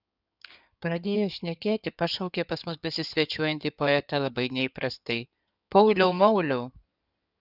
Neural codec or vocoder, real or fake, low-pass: codec, 16 kHz in and 24 kHz out, 2.2 kbps, FireRedTTS-2 codec; fake; 5.4 kHz